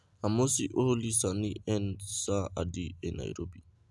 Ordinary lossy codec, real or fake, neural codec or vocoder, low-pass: none; real; none; none